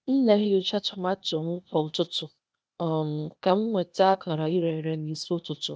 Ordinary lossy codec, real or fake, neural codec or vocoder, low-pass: none; fake; codec, 16 kHz, 0.8 kbps, ZipCodec; none